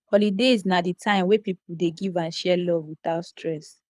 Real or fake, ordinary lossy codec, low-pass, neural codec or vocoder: fake; none; none; codec, 24 kHz, 6 kbps, HILCodec